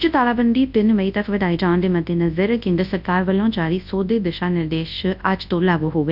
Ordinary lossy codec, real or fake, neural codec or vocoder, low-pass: none; fake; codec, 24 kHz, 0.9 kbps, WavTokenizer, large speech release; 5.4 kHz